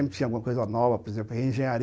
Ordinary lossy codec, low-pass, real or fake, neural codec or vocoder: none; none; real; none